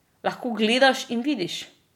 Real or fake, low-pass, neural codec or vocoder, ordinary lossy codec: real; 19.8 kHz; none; none